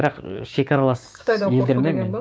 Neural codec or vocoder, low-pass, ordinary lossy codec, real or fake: codec, 16 kHz, 6 kbps, DAC; none; none; fake